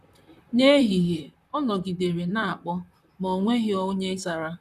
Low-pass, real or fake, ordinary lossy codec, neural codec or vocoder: 14.4 kHz; fake; none; vocoder, 44.1 kHz, 128 mel bands, Pupu-Vocoder